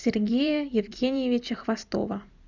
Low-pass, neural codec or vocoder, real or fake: 7.2 kHz; vocoder, 44.1 kHz, 128 mel bands every 256 samples, BigVGAN v2; fake